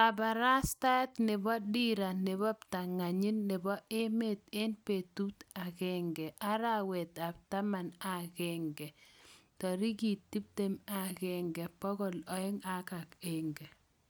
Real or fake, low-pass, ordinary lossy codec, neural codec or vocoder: real; none; none; none